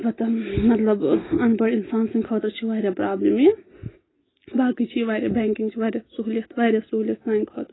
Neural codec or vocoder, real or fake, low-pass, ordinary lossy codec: none; real; 7.2 kHz; AAC, 16 kbps